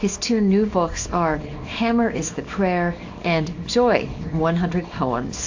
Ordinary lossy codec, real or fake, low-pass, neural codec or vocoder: AAC, 48 kbps; fake; 7.2 kHz; codec, 24 kHz, 0.9 kbps, WavTokenizer, small release